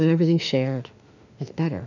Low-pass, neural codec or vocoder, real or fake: 7.2 kHz; autoencoder, 48 kHz, 32 numbers a frame, DAC-VAE, trained on Japanese speech; fake